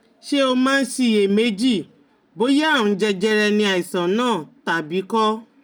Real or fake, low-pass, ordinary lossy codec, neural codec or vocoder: real; none; none; none